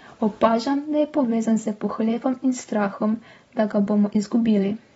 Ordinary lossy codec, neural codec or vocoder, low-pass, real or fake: AAC, 24 kbps; vocoder, 44.1 kHz, 128 mel bands every 512 samples, BigVGAN v2; 19.8 kHz; fake